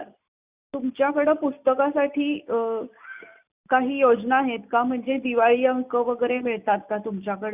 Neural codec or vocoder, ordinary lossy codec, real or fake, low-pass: none; Opus, 64 kbps; real; 3.6 kHz